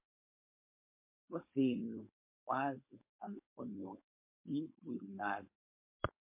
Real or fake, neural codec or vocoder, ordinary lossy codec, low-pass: fake; codec, 16 kHz, 4.8 kbps, FACodec; MP3, 24 kbps; 3.6 kHz